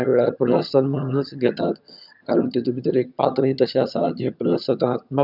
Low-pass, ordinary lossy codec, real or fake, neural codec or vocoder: 5.4 kHz; none; fake; vocoder, 22.05 kHz, 80 mel bands, HiFi-GAN